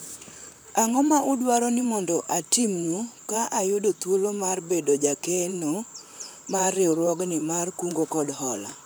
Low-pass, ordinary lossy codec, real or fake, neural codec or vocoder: none; none; fake; vocoder, 44.1 kHz, 128 mel bands, Pupu-Vocoder